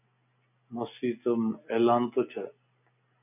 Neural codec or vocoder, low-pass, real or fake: none; 3.6 kHz; real